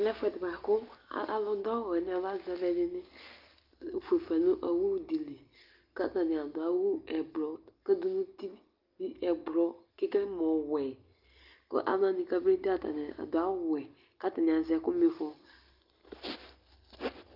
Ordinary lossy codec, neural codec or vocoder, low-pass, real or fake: Opus, 24 kbps; none; 5.4 kHz; real